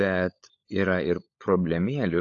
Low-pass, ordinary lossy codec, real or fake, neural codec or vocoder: 7.2 kHz; AAC, 48 kbps; fake; codec, 16 kHz, 16 kbps, FunCodec, trained on LibriTTS, 50 frames a second